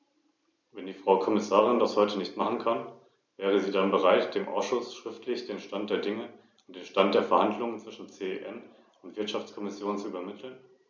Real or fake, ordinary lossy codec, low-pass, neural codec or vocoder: real; none; 7.2 kHz; none